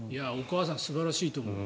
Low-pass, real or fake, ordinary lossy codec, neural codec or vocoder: none; real; none; none